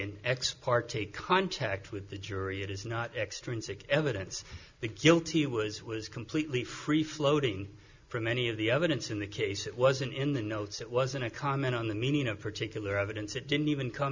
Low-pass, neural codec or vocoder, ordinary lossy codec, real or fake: 7.2 kHz; none; Opus, 64 kbps; real